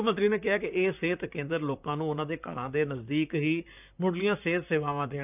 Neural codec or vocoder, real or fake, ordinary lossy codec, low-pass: vocoder, 44.1 kHz, 128 mel bands, Pupu-Vocoder; fake; none; 3.6 kHz